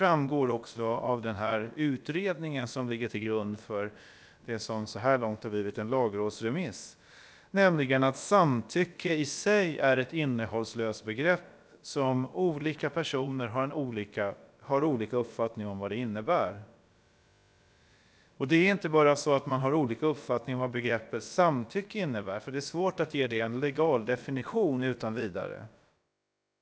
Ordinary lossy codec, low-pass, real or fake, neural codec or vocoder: none; none; fake; codec, 16 kHz, about 1 kbps, DyCAST, with the encoder's durations